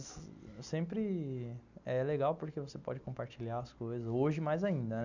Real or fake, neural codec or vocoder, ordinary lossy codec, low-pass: real; none; none; 7.2 kHz